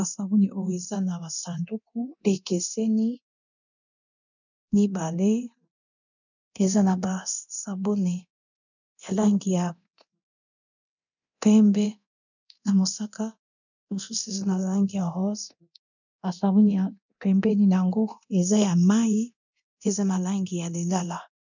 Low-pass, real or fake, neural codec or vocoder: 7.2 kHz; fake; codec, 24 kHz, 0.9 kbps, DualCodec